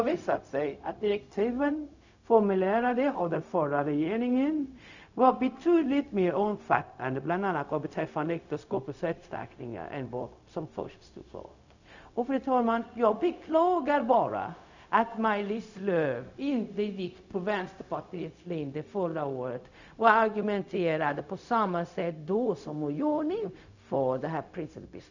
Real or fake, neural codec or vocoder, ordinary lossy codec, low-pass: fake; codec, 16 kHz, 0.4 kbps, LongCat-Audio-Codec; none; 7.2 kHz